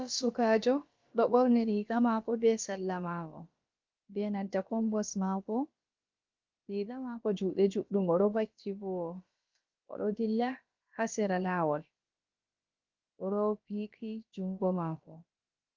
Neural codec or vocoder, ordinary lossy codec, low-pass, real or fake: codec, 16 kHz, about 1 kbps, DyCAST, with the encoder's durations; Opus, 24 kbps; 7.2 kHz; fake